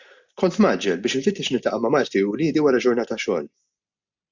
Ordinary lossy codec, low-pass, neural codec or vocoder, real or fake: MP3, 64 kbps; 7.2 kHz; none; real